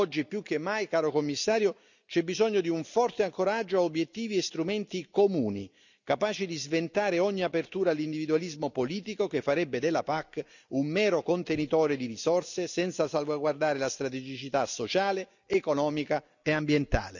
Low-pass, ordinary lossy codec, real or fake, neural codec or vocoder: 7.2 kHz; none; real; none